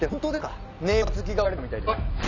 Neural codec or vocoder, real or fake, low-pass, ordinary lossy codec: none; real; 7.2 kHz; none